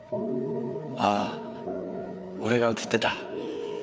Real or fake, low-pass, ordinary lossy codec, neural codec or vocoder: fake; none; none; codec, 16 kHz, 4 kbps, FreqCodec, larger model